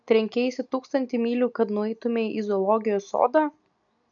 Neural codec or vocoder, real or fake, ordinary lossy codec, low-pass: none; real; MP3, 64 kbps; 7.2 kHz